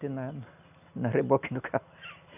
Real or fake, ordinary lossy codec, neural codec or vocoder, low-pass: real; none; none; 3.6 kHz